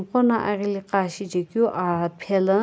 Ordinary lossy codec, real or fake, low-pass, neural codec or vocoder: none; real; none; none